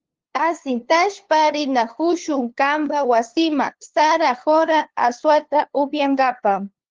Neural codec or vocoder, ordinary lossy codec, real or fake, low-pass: codec, 16 kHz, 2 kbps, FunCodec, trained on LibriTTS, 25 frames a second; Opus, 16 kbps; fake; 7.2 kHz